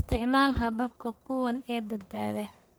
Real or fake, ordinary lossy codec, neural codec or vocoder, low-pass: fake; none; codec, 44.1 kHz, 1.7 kbps, Pupu-Codec; none